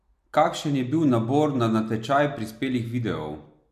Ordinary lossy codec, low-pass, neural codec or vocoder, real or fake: MP3, 96 kbps; 14.4 kHz; none; real